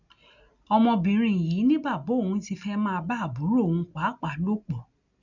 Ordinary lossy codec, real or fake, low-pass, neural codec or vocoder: none; real; 7.2 kHz; none